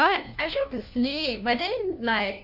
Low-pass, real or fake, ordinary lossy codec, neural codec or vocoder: 5.4 kHz; fake; none; codec, 16 kHz, 1 kbps, FunCodec, trained on LibriTTS, 50 frames a second